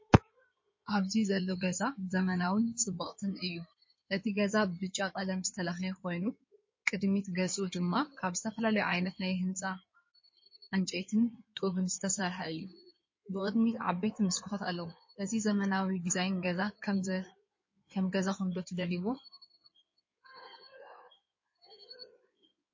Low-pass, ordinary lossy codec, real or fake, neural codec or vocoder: 7.2 kHz; MP3, 32 kbps; fake; codec, 16 kHz in and 24 kHz out, 2.2 kbps, FireRedTTS-2 codec